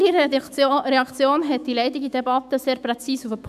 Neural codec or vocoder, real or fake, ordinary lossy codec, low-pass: autoencoder, 48 kHz, 128 numbers a frame, DAC-VAE, trained on Japanese speech; fake; none; 14.4 kHz